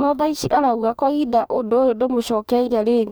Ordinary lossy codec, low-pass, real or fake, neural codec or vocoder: none; none; fake; codec, 44.1 kHz, 2.6 kbps, DAC